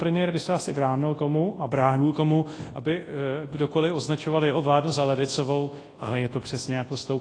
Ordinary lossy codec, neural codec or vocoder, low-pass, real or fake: AAC, 32 kbps; codec, 24 kHz, 0.9 kbps, WavTokenizer, large speech release; 9.9 kHz; fake